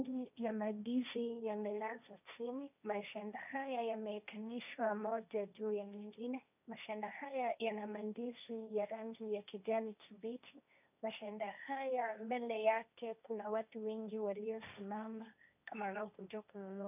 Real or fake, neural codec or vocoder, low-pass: fake; codec, 16 kHz, 1.1 kbps, Voila-Tokenizer; 3.6 kHz